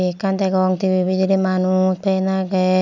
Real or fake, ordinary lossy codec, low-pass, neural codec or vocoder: real; none; 7.2 kHz; none